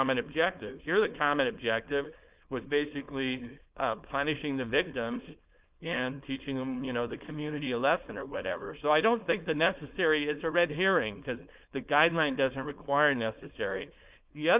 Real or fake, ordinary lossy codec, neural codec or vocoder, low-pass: fake; Opus, 24 kbps; codec, 16 kHz, 4.8 kbps, FACodec; 3.6 kHz